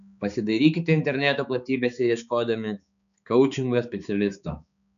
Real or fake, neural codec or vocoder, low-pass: fake; codec, 16 kHz, 4 kbps, X-Codec, HuBERT features, trained on balanced general audio; 7.2 kHz